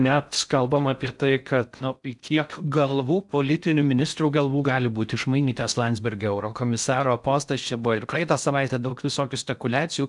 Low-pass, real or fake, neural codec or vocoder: 10.8 kHz; fake; codec, 16 kHz in and 24 kHz out, 0.6 kbps, FocalCodec, streaming, 4096 codes